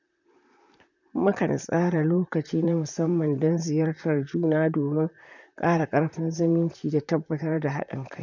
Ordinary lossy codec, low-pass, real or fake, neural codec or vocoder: none; 7.2 kHz; fake; vocoder, 44.1 kHz, 128 mel bands, Pupu-Vocoder